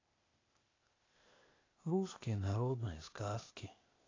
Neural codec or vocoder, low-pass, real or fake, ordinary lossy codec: codec, 16 kHz, 0.8 kbps, ZipCodec; 7.2 kHz; fake; AAC, 32 kbps